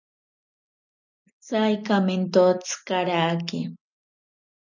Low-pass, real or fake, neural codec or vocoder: 7.2 kHz; real; none